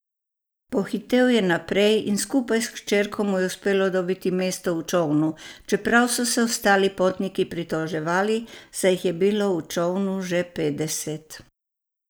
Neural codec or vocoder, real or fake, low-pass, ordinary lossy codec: none; real; none; none